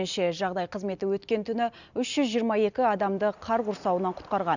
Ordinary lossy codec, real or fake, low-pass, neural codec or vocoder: none; real; 7.2 kHz; none